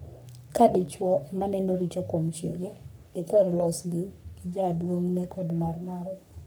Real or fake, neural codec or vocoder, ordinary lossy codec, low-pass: fake; codec, 44.1 kHz, 3.4 kbps, Pupu-Codec; none; none